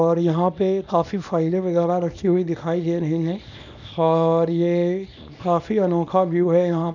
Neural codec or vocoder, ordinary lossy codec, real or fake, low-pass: codec, 24 kHz, 0.9 kbps, WavTokenizer, small release; none; fake; 7.2 kHz